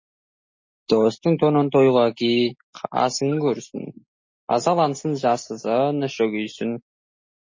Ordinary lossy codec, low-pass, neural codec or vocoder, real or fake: MP3, 32 kbps; 7.2 kHz; none; real